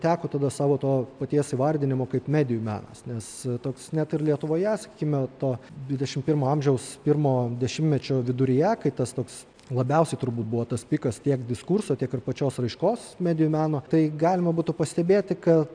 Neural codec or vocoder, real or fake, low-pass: none; real; 9.9 kHz